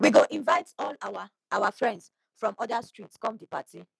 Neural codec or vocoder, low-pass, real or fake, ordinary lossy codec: none; none; real; none